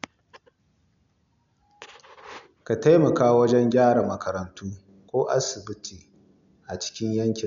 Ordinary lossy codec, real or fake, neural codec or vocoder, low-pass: MP3, 64 kbps; real; none; 7.2 kHz